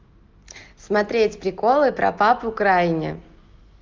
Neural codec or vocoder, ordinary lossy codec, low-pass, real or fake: none; Opus, 32 kbps; 7.2 kHz; real